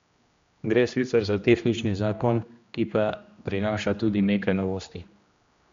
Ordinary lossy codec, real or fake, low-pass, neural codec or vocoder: MP3, 64 kbps; fake; 7.2 kHz; codec, 16 kHz, 1 kbps, X-Codec, HuBERT features, trained on general audio